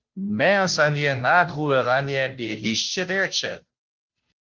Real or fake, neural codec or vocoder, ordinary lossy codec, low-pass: fake; codec, 16 kHz, 0.5 kbps, FunCodec, trained on Chinese and English, 25 frames a second; Opus, 32 kbps; 7.2 kHz